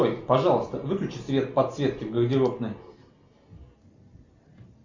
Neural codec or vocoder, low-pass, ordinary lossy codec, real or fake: none; 7.2 kHz; Opus, 64 kbps; real